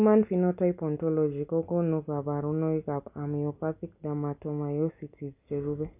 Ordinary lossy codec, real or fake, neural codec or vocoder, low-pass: AAC, 32 kbps; real; none; 3.6 kHz